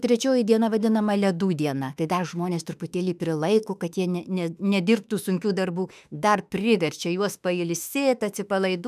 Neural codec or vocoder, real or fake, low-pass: autoencoder, 48 kHz, 32 numbers a frame, DAC-VAE, trained on Japanese speech; fake; 14.4 kHz